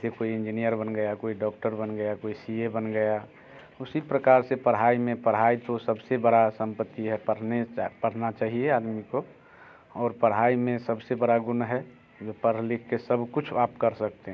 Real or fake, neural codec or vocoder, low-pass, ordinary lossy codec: real; none; none; none